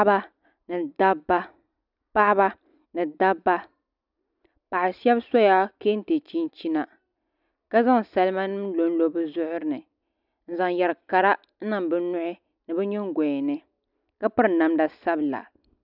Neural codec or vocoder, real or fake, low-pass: none; real; 5.4 kHz